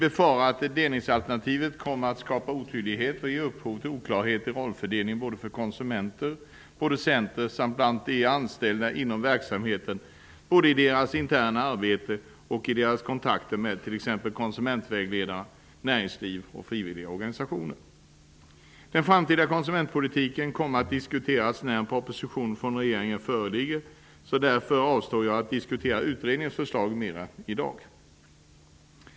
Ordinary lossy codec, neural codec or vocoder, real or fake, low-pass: none; none; real; none